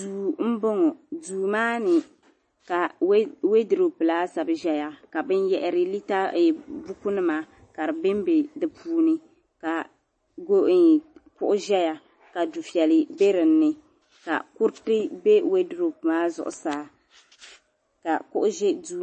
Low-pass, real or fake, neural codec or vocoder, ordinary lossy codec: 10.8 kHz; real; none; MP3, 32 kbps